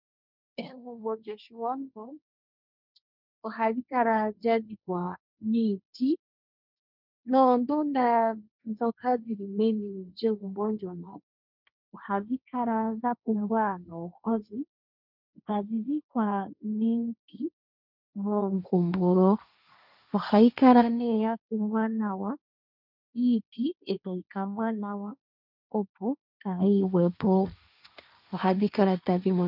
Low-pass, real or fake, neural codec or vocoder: 5.4 kHz; fake; codec, 16 kHz, 1.1 kbps, Voila-Tokenizer